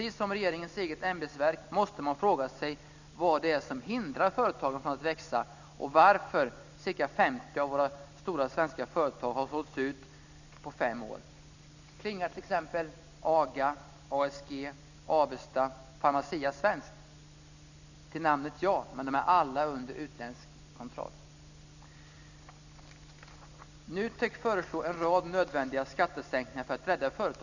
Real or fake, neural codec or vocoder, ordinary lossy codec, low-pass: real; none; none; 7.2 kHz